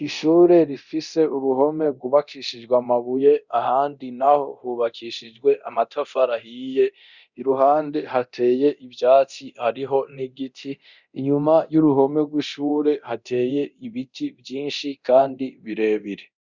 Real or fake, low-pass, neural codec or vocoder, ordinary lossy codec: fake; 7.2 kHz; codec, 24 kHz, 0.9 kbps, DualCodec; Opus, 64 kbps